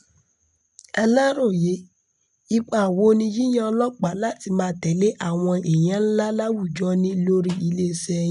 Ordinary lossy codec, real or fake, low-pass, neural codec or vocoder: none; real; 10.8 kHz; none